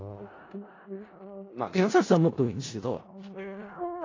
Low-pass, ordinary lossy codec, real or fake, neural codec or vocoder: 7.2 kHz; AAC, 32 kbps; fake; codec, 16 kHz in and 24 kHz out, 0.4 kbps, LongCat-Audio-Codec, four codebook decoder